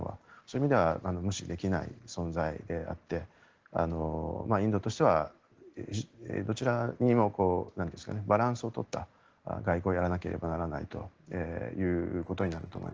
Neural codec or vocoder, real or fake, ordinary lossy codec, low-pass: none; real; Opus, 16 kbps; 7.2 kHz